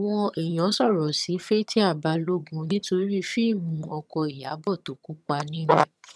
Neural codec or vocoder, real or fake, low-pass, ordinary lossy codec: vocoder, 22.05 kHz, 80 mel bands, HiFi-GAN; fake; none; none